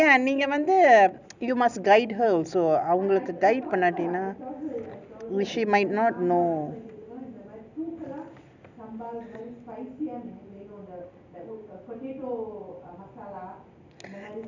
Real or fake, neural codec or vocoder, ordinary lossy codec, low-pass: real; none; none; 7.2 kHz